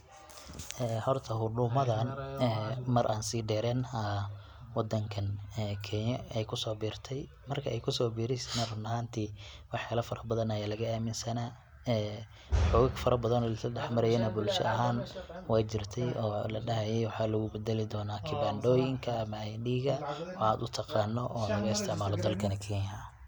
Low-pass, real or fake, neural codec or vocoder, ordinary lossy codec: 19.8 kHz; real; none; none